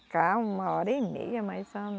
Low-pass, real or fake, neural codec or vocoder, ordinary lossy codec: none; real; none; none